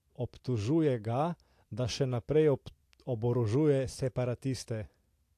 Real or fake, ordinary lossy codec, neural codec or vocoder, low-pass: real; AAC, 64 kbps; none; 14.4 kHz